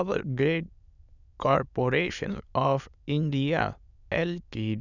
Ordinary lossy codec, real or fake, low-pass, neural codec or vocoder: none; fake; 7.2 kHz; autoencoder, 22.05 kHz, a latent of 192 numbers a frame, VITS, trained on many speakers